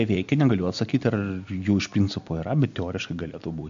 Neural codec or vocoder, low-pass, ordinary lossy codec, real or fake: none; 7.2 kHz; MP3, 64 kbps; real